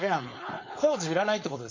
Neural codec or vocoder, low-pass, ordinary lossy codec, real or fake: codec, 16 kHz, 4.8 kbps, FACodec; 7.2 kHz; MP3, 32 kbps; fake